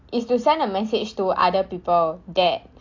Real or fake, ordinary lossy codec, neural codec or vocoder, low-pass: real; AAC, 48 kbps; none; 7.2 kHz